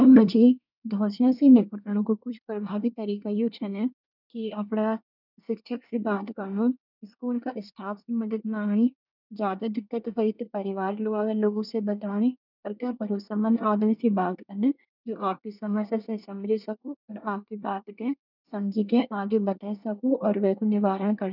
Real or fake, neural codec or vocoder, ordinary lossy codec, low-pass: fake; codec, 24 kHz, 1 kbps, SNAC; none; 5.4 kHz